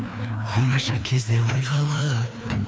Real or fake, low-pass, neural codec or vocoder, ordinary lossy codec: fake; none; codec, 16 kHz, 2 kbps, FreqCodec, larger model; none